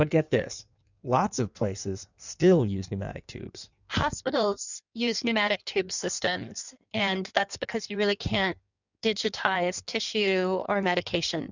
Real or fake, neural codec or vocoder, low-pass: fake; codec, 16 kHz in and 24 kHz out, 1.1 kbps, FireRedTTS-2 codec; 7.2 kHz